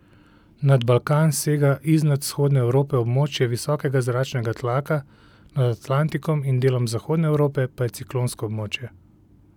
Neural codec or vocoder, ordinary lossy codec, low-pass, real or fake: none; none; 19.8 kHz; real